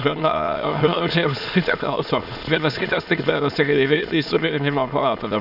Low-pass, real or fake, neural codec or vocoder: 5.4 kHz; fake; autoencoder, 22.05 kHz, a latent of 192 numbers a frame, VITS, trained on many speakers